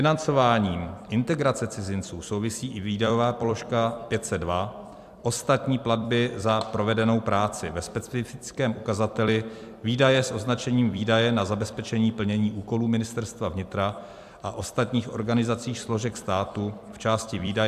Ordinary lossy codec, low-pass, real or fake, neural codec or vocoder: AAC, 96 kbps; 14.4 kHz; fake; vocoder, 44.1 kHz, 128 mel bands every 256 samples, BigVGAN v2